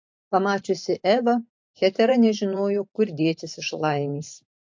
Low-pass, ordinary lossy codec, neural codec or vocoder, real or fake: 7.2 kHz; MP3, 48 kbps; vocoder, 44.1 kHz, 128 mel bands every 512 samples, BigVGAN v2; fake